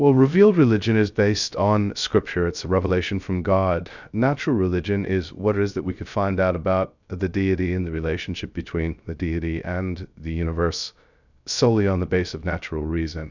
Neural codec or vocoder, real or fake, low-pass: codec, 16 kHz, 0.3 kbps, FocalCodec; fake; 7.2 kHz